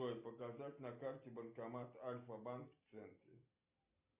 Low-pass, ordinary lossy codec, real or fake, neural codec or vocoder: 3.6 kHz; Opus, 64 kbps; real; none